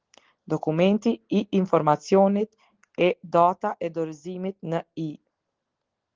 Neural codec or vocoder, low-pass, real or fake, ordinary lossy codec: none; 7.2 kHz; real; Opus, 16 kbps